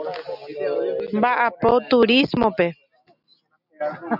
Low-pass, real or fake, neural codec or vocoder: 5.4 kHz; real; none